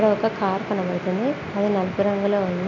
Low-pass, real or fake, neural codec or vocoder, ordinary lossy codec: 7.2 kHz; real; none; none